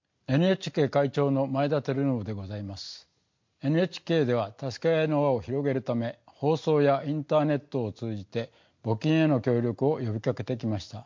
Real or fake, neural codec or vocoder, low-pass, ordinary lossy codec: real; none; 7.2 kHz; MP3, 48 kbps